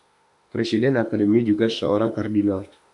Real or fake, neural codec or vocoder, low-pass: fake; autoencoder, 48 kHz, 32 numbers a frame, DAC-VAE, trained on Japanese speech; 10.8 kHz